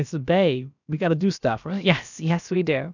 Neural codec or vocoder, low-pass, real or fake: codec, 16 kHz, about 1 kbps, DyCAST, with the encoder's durations; 7.2 kHz; fake